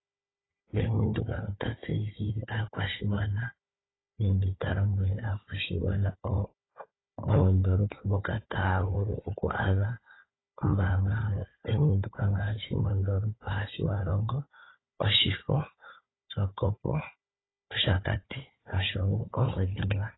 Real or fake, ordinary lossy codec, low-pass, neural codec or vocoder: fake; AAC, 16 kbps; 7.2 kHz; codec, 16 kHz, 4 kbps, FunCodec, trained on Chinese and English, 50 frames a second